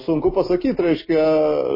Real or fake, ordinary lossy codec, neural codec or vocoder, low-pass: real; MP3, 24 kbps; none; 5.4 kHz